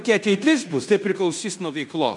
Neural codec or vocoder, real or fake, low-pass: codec, 16 kHz in and 24 kHz out, 0.9 kbps, LongCat-Audio-Codec, fine tuned four codebook decoder; fake; 10.8 kHz